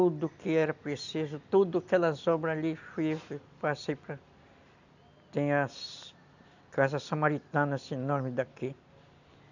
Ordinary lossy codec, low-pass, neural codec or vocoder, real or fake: none; 7.2 kHz; none; real